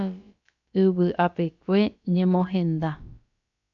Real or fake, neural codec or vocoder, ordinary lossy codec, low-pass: fake; codec, 16 kHz, about 1 kbps, DyCAST, with the encoder's durations; MP3, 96 kbps; 7.2 kHz